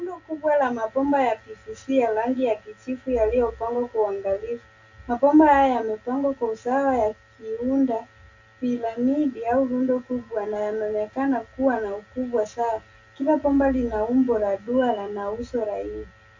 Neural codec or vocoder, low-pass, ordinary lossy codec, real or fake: none; 7.2 kHz; AAC, 48 kbps; real